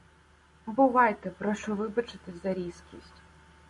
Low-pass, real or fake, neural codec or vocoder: 10.8 kHz; real; none